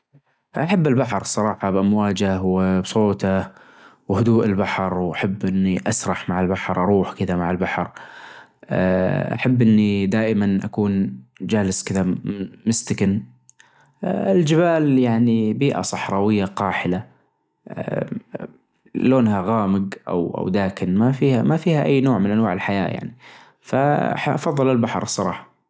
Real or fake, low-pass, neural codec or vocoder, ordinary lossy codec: real; none; none; none